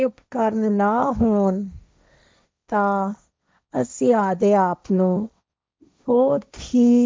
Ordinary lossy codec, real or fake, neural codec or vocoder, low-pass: none; fake; codec, 16 kHz, 1.1 kbps, Voila-Tokenizer; none